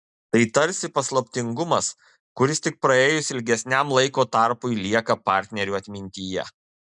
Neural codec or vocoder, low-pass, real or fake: none; 10.8 kHz; real